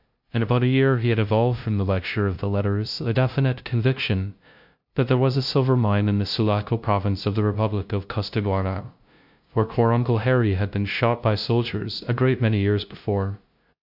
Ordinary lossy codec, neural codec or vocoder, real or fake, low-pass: AAC, 48 kbps; codec, 16 kHz, 0.5 kbps, FunCodec, trained on LibriTTS, 25 frames a second; fake; 5.4 kHz